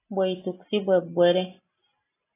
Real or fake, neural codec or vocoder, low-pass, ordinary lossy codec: real; none; 3.6 kHz; AAC, 16 kbps